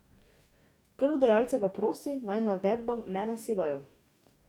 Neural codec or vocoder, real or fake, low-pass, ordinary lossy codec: codec, 44.1 kHz, 2.6 kbps, DAC; fake; 19.8 kHz; none